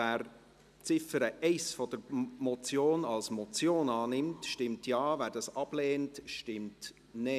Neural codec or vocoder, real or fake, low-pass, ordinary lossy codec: none; real; 14.4 kHz; none